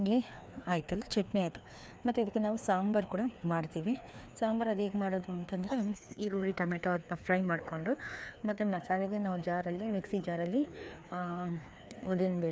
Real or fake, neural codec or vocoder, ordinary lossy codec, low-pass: fake; codec, 16 kHz, 2 kbps, FreqCodec, larger model; none; none